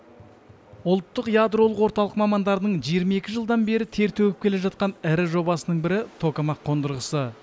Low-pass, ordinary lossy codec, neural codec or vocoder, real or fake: none; none; none; real